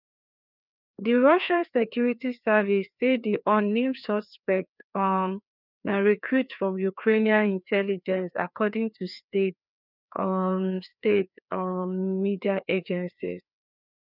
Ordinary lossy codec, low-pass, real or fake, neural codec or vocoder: none; 5.4 kHz; fake; codec, 16 kHz, 2 kbps, FreqCodec, larger model